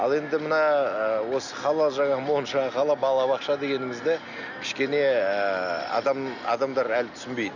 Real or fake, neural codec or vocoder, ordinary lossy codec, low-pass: real; none; none; 7.2 kHz